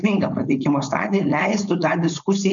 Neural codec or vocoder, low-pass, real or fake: codec, 16 kHz, 4.8 kbps, FACodec; 7.2 kHz; fake